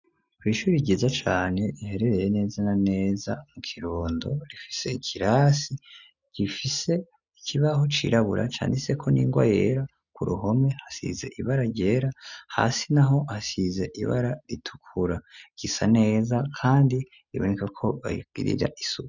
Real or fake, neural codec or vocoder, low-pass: real; none; 7.2 kHz